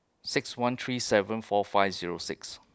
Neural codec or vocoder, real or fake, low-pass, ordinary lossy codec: none; real; none; none